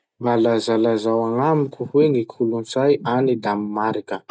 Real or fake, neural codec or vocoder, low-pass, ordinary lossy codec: real; none; none; none